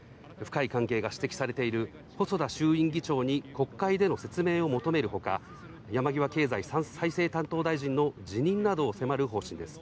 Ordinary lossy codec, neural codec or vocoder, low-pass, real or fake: none; none; none; real